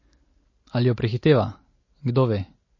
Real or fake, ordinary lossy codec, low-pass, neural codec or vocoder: real; MP3, 32 kbps; 7.2 kHz; none